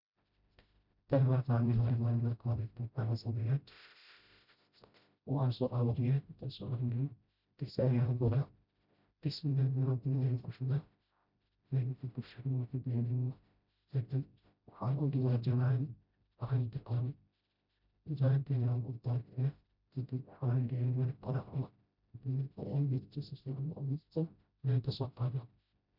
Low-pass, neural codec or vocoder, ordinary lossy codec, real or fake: 5.4 kHz; codec, 16 kHz, 0.5 kbps, FreqCodec, smaller model; none; fake